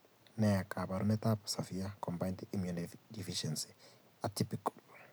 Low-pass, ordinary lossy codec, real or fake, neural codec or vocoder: none; none; real; none